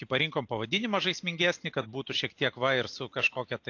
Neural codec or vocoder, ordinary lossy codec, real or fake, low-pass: none; AAC, 48 kbps; real; 7.2 kHz